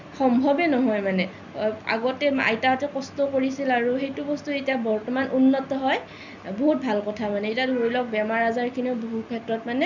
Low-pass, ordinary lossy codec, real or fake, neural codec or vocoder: 7.2 kHz; none; real; none